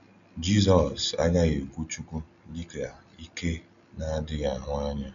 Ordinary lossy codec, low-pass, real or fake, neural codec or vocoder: none; 7.2 kHz; real; none